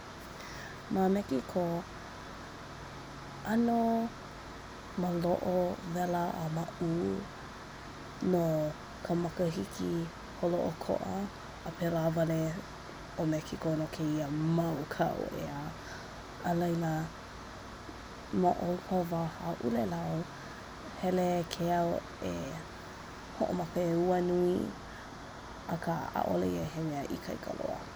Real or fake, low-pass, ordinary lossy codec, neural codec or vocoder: real; none; none; none